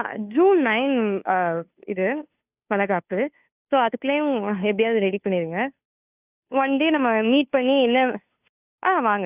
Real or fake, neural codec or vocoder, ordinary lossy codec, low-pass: fake; codec, 16 kHz, 2 kbps, FunCodec, trained on Chinese and English, 25 frames a second; none; 3.6 kHz